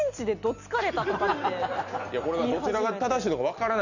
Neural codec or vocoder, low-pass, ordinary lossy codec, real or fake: none; 7.2 kHz; none; real